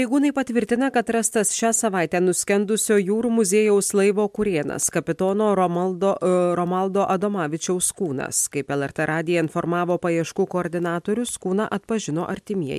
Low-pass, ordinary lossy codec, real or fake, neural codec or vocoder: 14.4 kHz; MP3, 96 kbps; real; none